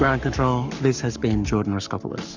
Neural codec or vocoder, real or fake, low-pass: codec, 44.1 kHz, 7.8 kbps, Pupu-Codec; fake; 7.2 kHz